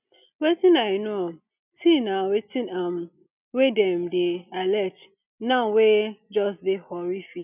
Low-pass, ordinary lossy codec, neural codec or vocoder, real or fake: 3.6 kHz; none; none; real